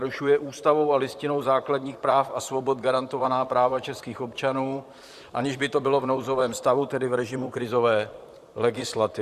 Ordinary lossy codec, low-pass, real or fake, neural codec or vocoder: Opus, 64 kbps; 14.4 kHz; fake; vocoder, 44.1 kHz, 128 mel bands, Pupu-Vocoder